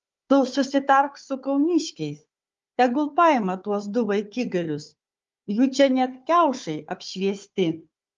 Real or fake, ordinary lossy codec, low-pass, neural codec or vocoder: fake; Opus, 32 kbps; 7.2 kHz; codec, 16 kHz, 4 kbps, FunCodec, trained on Chinese and English, 50 frames a second